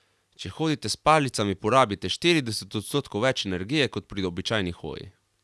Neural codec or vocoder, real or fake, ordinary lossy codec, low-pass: none; real; none; none